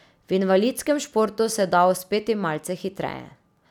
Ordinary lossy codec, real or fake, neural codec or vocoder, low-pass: none; real; none; 19.8 kHz